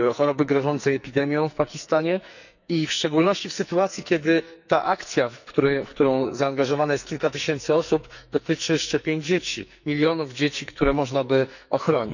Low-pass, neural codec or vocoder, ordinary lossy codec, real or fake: 7.2 kHz; codec, 44.1 kHz, 2.6 kbps, SNAC; none; fake